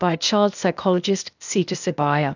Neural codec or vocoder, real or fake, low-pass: codec, 16 kHz, 0.8 kbps, ZipCodec; fake; 7.2 kHz